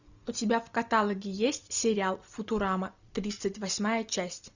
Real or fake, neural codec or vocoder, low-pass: real; none; 7.2 kHz